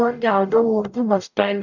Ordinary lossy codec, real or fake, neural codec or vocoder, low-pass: none; fake; codec, 44.1 kHz, 0.9 kbps, DAC; 7.2 kHz